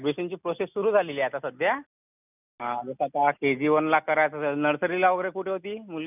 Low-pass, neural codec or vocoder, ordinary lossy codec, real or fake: 3.6 kHz; none; none; real